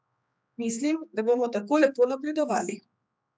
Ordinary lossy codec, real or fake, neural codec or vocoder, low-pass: none; fake; codec, 16 kHz, 2 kbps, X-Codec, HuBERT features, trained on general audio; none